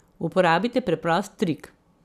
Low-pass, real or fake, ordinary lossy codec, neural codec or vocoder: 14.4 kHz; fake; none; vocoder, 44.1 kHz, 128 mel bands every 512 samples, BigVGAN v2